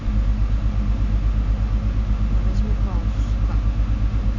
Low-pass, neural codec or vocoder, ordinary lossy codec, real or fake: 7.2 kHz; none; none; real